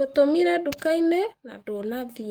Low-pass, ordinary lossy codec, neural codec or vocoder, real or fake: 19.8 kHz; Opus, 32 kbps; codec, 44.1 kHz, 7.8 kbps, DAC; fake